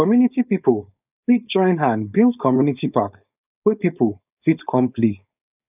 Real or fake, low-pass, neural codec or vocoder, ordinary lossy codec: fake; 3.6 kHz; codec, 16 kHz, 4.8 kbps, FACodec; none